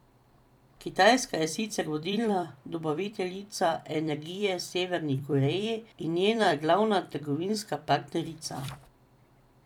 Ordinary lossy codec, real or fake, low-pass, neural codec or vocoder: none; fake; 19.8 kHz; vocoder, 44.1 kHz, 128 mel bands every 256 samples, BigVGAN v2